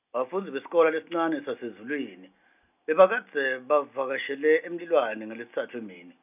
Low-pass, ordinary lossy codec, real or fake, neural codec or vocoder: 3.6 kHz; none; real; none